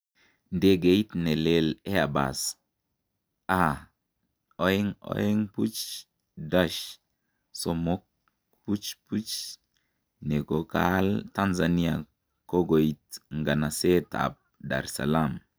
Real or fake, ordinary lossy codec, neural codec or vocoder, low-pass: real; none; none; none